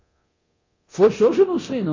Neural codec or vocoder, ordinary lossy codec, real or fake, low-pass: codec, 24 kHz, 0.9 kbps, DualCodec; MP3, 32 kbps; fake; 7.2 kHz